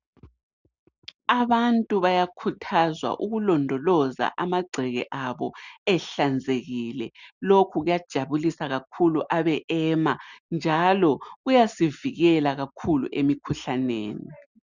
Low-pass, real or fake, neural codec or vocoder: 7.2 kHz; real; none